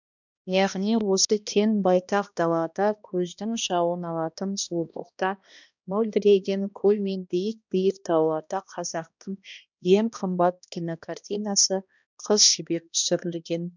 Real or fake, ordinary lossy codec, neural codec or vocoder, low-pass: fake; none; codec, 16 kHz, 1 kbps, X-Codec, HuBERT features, trained on balanced general audio; 7.2 kHz